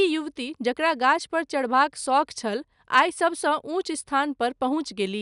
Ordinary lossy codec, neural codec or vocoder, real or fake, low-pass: none; none; real; 10.8 kHz